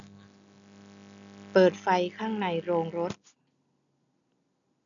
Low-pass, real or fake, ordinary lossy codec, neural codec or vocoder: 7.2 kHz; real; none; none